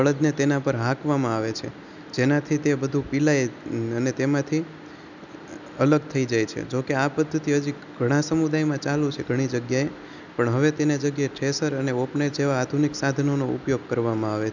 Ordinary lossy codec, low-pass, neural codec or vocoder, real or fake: none; 7.2 kHz; none; real